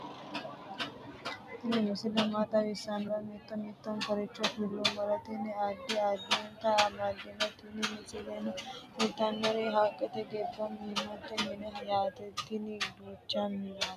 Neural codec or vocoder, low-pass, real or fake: none; 14.4 kHz; real